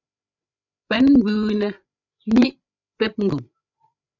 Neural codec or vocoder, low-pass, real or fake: codec, 16 kHz, 8 kbps, FreqCodec, larger model; 7.2 kHz; fake